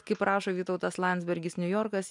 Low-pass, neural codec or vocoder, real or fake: 10.8 kHz; none; real